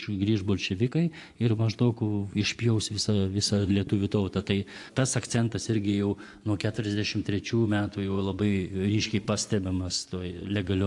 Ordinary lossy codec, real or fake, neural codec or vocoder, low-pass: AAC, 64 kbps; fake; vocoder, 24 kHz, 100 mel bands, Vocos; 10.8 kHz